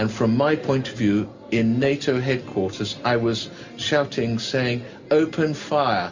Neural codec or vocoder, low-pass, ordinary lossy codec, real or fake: none; 7.2 kHz; MP3, 64 kbps; real